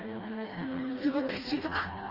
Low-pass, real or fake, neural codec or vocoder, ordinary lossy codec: 5.4 kHz; fake; codec, 16 kHz, 1 kbps, FreqCodec, smaller model; Opus, 24 kbps